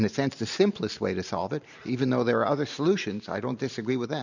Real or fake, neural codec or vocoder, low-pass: fake; vocoder, 44.1 kHz, 128 mel bands every 512 samples, BigVGAN v2; 7.2 kHz